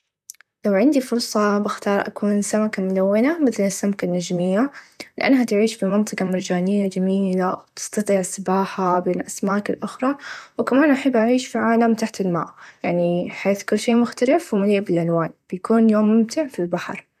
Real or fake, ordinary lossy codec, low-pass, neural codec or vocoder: fake; none; 14.4 kHz; vocoder, 44.1 kHz, 128 mel bands, Pupu-Vocoder